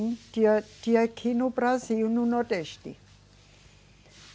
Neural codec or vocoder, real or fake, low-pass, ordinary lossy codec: none; real; none; none